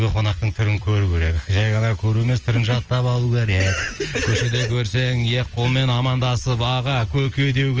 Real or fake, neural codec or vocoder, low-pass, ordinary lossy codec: real; none; 7.2 kHz; Opus, 24 kbps